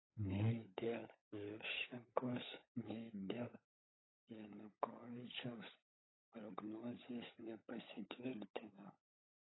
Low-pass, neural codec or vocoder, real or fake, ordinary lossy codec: 7.2 kHz; codec, 16 kHz, 16 kbps, FunCodec, trained on LibriTTS, 50 frames a second; fake; AAC, 16 kbps